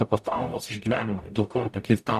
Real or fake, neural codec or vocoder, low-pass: fake; codec, 44.1 kHz, 0.9 kbps, DAC; 14.4 kHz